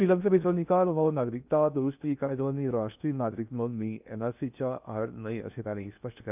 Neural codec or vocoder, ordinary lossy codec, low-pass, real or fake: codec, 16 kHz in and 24 kHz out, 0.6 kbps, FocalCodec, streaming, 2048 codes; none; 3.6 kHz; fake